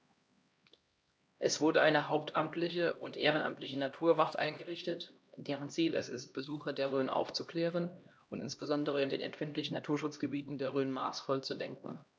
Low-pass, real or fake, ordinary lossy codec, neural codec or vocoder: none; fake; none; codec, 16 kHz, 1 kbps, X-Codec, HuBERT features, trained on LibriSpeech